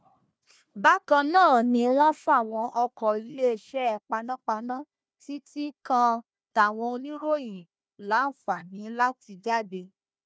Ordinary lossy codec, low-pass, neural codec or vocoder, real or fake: none; none; codec, 16 kHz, 1 kbps, FunCodec, trained on Chinese and English, 50 frames a second; fake